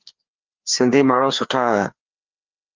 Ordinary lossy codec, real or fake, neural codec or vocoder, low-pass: Opus, 32 kbps; fake; codec, 16 kHz, 2 kbps, FreqCodec, larger model; 7.2 kHz